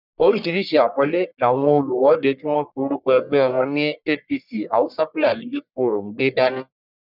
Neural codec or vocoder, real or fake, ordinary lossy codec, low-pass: codec, 44.1 kHz, 1.7 kbps, Pupu-Codec; fake; none; 5.4 kHz